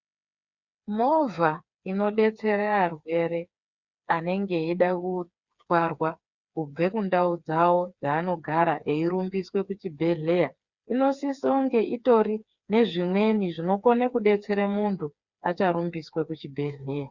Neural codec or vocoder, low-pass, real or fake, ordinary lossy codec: codec, 16 kHz, 4 kbps, FreqCodec, smaller model; 7.2 kHz; fake; Opus, 64 kbps